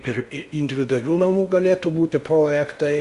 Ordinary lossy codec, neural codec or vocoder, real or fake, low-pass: Opus, 64 kbps; codec, 16 kHz in and 24 kHz out, 0.6 kbps, FocalCodec, streaming, 4096 codes; fake; 10.8 kHz